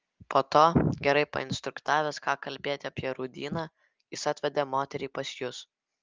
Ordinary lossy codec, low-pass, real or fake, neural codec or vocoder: Opus, 32 kbps; 7.2 kHz; real; none